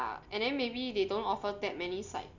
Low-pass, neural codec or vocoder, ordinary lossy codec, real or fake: 7.2 kHz; none; none; real